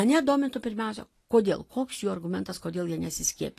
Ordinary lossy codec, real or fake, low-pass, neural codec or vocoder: AAC, 48 kbps; real; 14.4 kHz; none